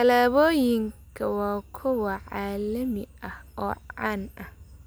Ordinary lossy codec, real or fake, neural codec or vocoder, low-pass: none; real; none; none